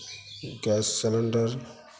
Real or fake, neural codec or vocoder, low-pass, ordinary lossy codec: real; none; none; none